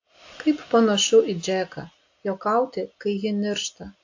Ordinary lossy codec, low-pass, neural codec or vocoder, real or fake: AAC, 48 kbps; 7.2 kHz; none; real